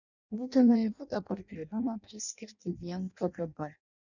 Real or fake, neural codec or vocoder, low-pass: fake; codec, 16 kHz in and 24 kHz out, 0.6 kbps, FireRedTTS-2 codec; 7.2 kHz